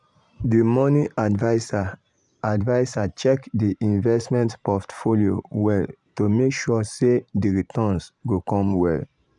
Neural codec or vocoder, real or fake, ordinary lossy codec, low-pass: vocoder, 44.1 kHz, 128 mel bands every 512 samples, BigVGAN v2; fake; none; 10.8 kHz